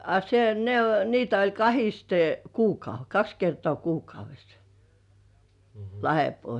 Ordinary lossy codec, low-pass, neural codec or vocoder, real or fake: none; 10.8 kHz; none; real